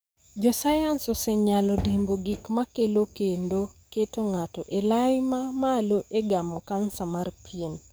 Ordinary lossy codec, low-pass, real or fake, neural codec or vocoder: none; none; fake; codec, 44.1 kHz, 7.8 kbps, DAC